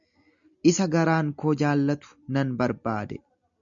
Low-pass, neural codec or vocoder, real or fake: 7.2 kHz; none; real